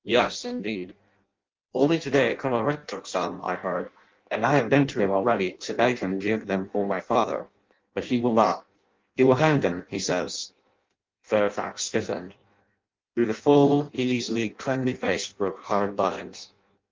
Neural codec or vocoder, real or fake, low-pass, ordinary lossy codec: codec, 16 kHz in and 24 kHz out, 0.6 kbps, FireRedTTS-2 codec; fake; 7.2 kHz; Opus, 32 kbps